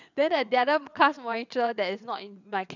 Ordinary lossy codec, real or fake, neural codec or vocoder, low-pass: none; fake; vocoder, 22.05 kHz, 80 mel bands, WaveNeXt; 7.2 kHz